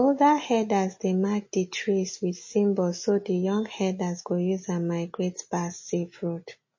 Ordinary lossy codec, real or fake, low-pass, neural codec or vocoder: MP3, 32 kbps; real; 7.2 kHz; none